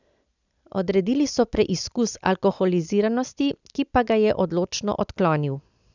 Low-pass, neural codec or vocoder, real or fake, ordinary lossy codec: 7.2 kHz; none; real; none